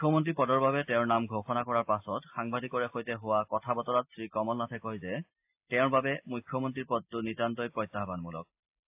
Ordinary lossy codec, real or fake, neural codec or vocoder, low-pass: AAC, 32 kbps; real; none; 3.6 kHz